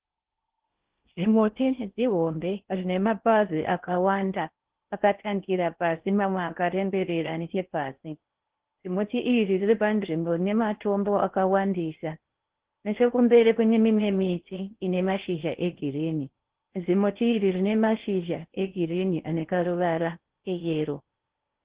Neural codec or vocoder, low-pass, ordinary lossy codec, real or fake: codec, 16 kHz in and 24 kHz out, 0.6 kbps, FocalCodec, streaming, 4096 codes; 3.6 kHz; Opus, 16 kbps; fake